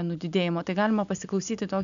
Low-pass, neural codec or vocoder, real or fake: 7.2 kHz; none; real